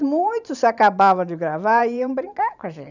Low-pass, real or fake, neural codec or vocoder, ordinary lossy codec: 7.2 kHz; fake; vocoder, 44.1 kHz, 128 mel bands every 512 samples, BigVGAN v2; none